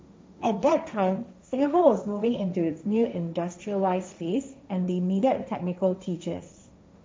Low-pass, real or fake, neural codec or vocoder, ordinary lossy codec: none; fake; codec, 16 kHz, 1.1 kbps, Voila-Tokenizer; none